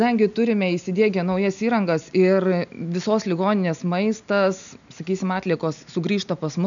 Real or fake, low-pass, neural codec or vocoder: real; 7.2 kHz; none